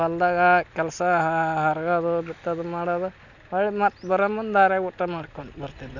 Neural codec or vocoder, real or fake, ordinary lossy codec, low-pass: none; real; none; 7.2 kHz